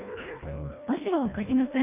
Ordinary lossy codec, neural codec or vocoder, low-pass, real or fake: none; codec, 24 kHz, 1.5 kbps, HILCodec; 3.6 kHz; fake